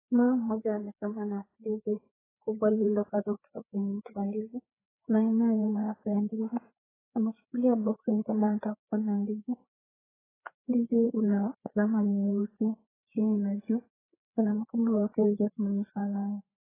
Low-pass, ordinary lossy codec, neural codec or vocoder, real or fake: 3.6 kHz; AAC, 16 kbps; codec, 16 kHz, 4 kbps, FreqCodec, larger model; fake